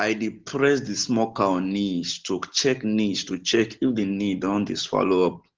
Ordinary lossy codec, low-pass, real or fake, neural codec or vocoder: Opus, 16 kbps; 7.2 kHz; real; none